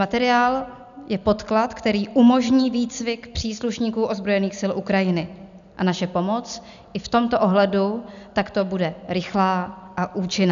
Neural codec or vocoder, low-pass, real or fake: none; 7.2 kHz; real